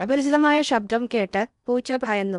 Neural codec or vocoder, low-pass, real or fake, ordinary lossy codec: codec, 16 kHz in and 24 kHz out, 0.8 kbps, FocalCodec, streaming, 65536 codes; 10.8 kHz; fake; none